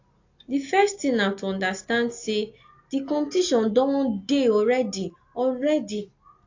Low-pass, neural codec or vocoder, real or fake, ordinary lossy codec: 7.2 kHz; none; real; AAC, 48 kbps